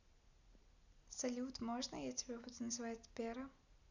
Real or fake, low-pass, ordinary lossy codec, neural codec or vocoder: real; 7.2 kHz; none; none